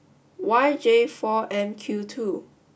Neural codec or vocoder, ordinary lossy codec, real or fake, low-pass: none; none; real; none